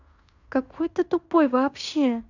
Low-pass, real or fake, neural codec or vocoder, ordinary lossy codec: 7.2 kHz; fake; codec, 16 kHz in and 24 kHz out, 0.9 kbps, LongCat-Audio-Codec, fine tuned four codebook decoder; none